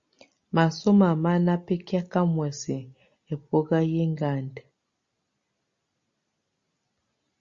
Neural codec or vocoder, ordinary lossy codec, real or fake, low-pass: none; Opus, 64 kbps; real; 7.2 kHz